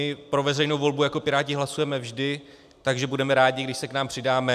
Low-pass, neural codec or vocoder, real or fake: 14.4 kHz; none; real